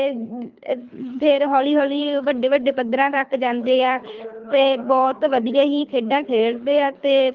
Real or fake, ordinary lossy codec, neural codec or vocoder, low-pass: fake; Opus, 32 kbps; codec, 24 kHz, 3 kbps, HILCodec; 7.2 kHz